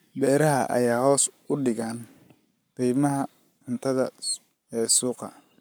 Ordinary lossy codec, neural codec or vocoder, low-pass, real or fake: none; codec, 44.1 kHz, 7.8 kbps, Pupu-Codec; none; fake